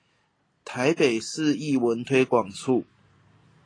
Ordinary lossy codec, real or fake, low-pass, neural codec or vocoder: AAC, 32 kbps; real; 9.9 kHz; none